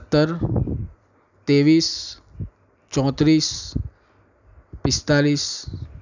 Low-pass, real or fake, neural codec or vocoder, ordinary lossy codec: 7.2 kHz; real; none; none